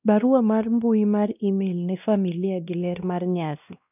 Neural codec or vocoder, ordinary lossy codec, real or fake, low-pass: codec, 16 kHz, 1 kbps, X-Codec, WavLM features, trained on Multilingual LibriSpeech; none; fake; 3.6 kHz